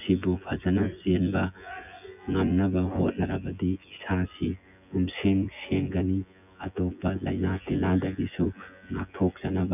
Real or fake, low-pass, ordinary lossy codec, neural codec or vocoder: fake; 3.6 kHz; none; vocoder, 24 kHz, 100 mel bands, Vocos